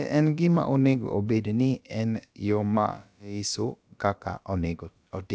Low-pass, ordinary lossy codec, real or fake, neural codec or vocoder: none; none; fake; codec, 16 kHz, about 1 kbps, DyCAST, with the encoder's durations